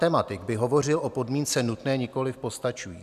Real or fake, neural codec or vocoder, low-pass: real; none; 14.4 kHz